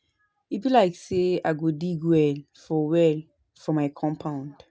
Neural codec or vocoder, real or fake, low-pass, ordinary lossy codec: none; real; none; none